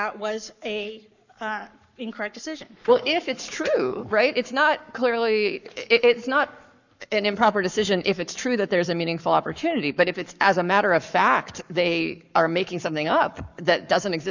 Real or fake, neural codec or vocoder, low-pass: fake; codec, 44.1 kHz, 7.8 kbps, Pupu-Codec; 7.2 kHz